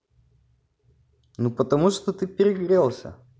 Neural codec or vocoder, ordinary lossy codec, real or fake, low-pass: none; none; real; none